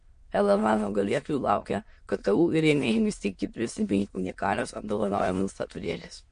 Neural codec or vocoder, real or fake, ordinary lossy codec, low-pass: autoencoder, 22.05 kHz, a latent of 192 numbers a frame, VITS, trained on many speakers; fake; MP3, 48 kbps; 9.9 kHz